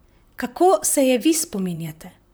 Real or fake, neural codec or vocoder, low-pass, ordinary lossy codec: fake; vocoder, 44.1 kHz, 128 mel bands, Pupu-Vocoder; none; none